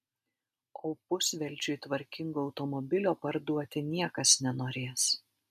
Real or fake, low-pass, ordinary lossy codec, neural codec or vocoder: real; 14.4 kHz; MP3, 64 kbps; none